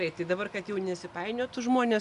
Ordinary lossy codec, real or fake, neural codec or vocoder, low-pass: MP3, 96 kbps; real; none; 10.8 kHz